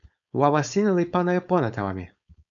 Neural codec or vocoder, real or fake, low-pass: codec, 16 kHz, 4.8 kbps, FACodec; fake; 7.2 kHz